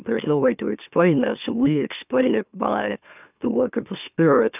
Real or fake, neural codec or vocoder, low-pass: fake; autoencoder, 44.1 kHz, a latent of 192 numbers a frame, MeloTTS; 3.6 kHz